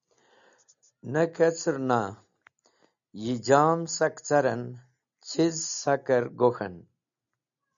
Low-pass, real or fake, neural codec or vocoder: 7.2 kHz; real; none